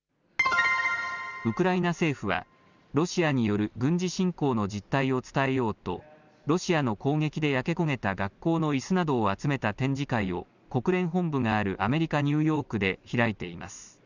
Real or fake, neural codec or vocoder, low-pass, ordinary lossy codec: real; none; 7.2 kHz; none